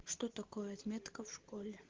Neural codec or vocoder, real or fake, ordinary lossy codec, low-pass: none; real; Opus, 16 kbps; 7.2 kHz